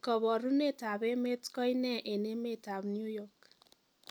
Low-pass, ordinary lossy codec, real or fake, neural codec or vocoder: none; none; real; none